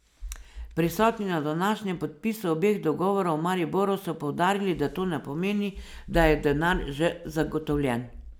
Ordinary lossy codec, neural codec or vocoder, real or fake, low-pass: none; none; real; none